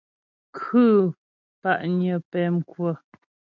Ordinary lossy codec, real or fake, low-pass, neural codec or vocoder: MP3, 64 kbps; real; 7.2 kHz; none